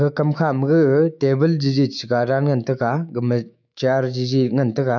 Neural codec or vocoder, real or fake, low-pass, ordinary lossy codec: autoencoder, 48 kHz, 128 numbers a frame, DAC-VAE, trained on Japanese speech; fake; 7.2 kHz; none